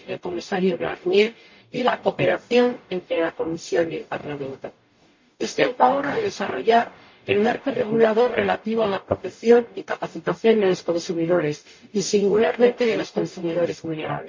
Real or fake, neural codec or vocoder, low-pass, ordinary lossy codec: fake; codec, 44.1 kHz, 0.9 kbps, DAC; 7.2 kHz; MP3, 32 kbps